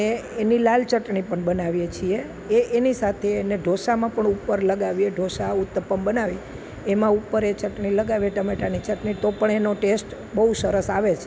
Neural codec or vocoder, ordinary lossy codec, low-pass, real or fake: none; none; none; real